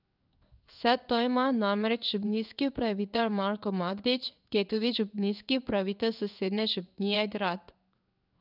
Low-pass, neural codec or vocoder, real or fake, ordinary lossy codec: 5.4 kHz; codec, 16 kHz in and 24 kHz out, 1 kbps, XY-Tokenizer; fake; none